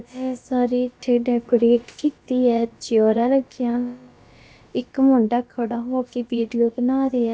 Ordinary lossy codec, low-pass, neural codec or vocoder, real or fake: none; none; codec, 16 kHz, about 1 kbps, DyCAST, with the encoder's durations; fake